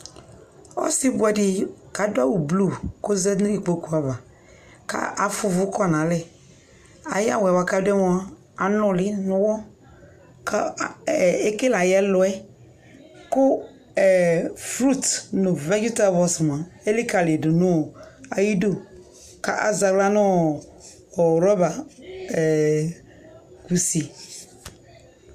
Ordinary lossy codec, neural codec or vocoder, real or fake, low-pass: AAC, 96 kbps; none; real; 14.4 kHz